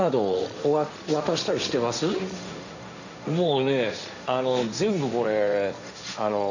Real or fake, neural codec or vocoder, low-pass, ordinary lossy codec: fake; codec, 16 kHz, 1.1 kbps, Voila-Tokenizer; 7.2 kHz; none